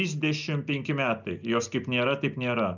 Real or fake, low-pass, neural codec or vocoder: real; 7.2 kHz; none